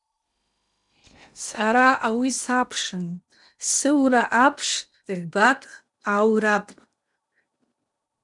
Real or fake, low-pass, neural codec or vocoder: fake; 10.8 kHz; codec, 16 kHz in and 24 kHz out, 0.8 kbps, FocalCodec, streaming, 65536 codes